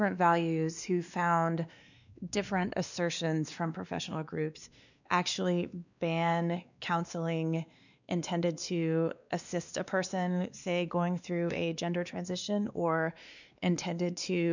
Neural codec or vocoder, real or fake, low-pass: codec, 16 kHz, 2 kbps, X-Codec, WavLM features, trained on Multilingual LibriSpeech; fake; 7.2 kHz